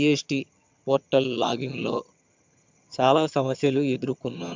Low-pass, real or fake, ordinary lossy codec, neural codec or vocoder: 7.2 kHz; fake; MP3, 64 kbps; vocoder, 22.05 kHz, 80 mel bands, HiFi-GAN